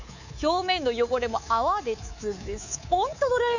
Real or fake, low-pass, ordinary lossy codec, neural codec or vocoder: fake; 7.2 kHz; none; codec, 24 kHz, 3.1 kbps, DualCodec